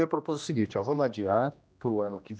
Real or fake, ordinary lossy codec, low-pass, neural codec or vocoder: fake; none; none; codec, 16 kHz, 1 kbps, X-Codec, HuBERT features, trained on general audio